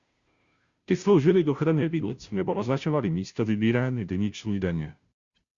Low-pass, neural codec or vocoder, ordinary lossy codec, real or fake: 7.2 kHz; codec, 16 kHz, 0.5 kbps, FunCodec, trained on Chinese and English, 25 frames a second; Opus, 64 kbps; fake